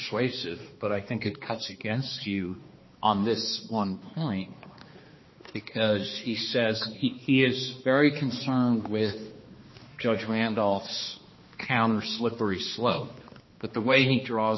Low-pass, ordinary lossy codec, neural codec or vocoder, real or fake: 7.2 kHz; MP3, 24 kbps; codec, 16 kHz, 2 kbps, X-Codec, HuBERT features, trained on balanced general audio; fake